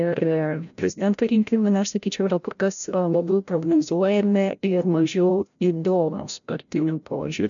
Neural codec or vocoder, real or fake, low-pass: codec, 16 kHz, 0.5 kbps, FreqCodec, larger model; fake; 7.2 kHz